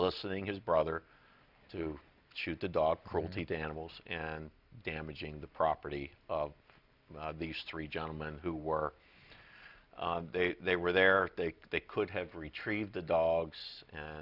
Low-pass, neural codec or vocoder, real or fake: 5.4 kHz; none; real